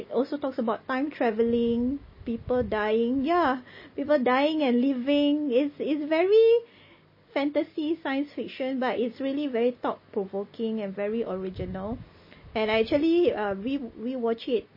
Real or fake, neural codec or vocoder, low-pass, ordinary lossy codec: real; none; 5.4 kHz; MP3, 24 kbps